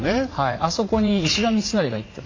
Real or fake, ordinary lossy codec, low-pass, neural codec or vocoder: fake; AAC, 32 kbps; 7.2 kHz; vocoder, 44.1 kHz, 80 mel bands, Vocos